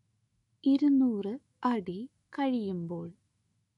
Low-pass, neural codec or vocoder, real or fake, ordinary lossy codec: 19.8 kHz; autoencoder, 48 kHz, 128 numbers a frame, DAC-VAE, trained on Japanese speech; fake; MP3, 48 kbps